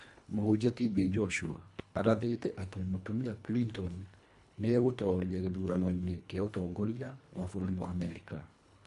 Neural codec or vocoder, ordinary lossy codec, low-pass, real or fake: codec, 24 kHz, 1.5 kbps, HILCodec; AAC, 96 kbps; 10.8 kHz; fake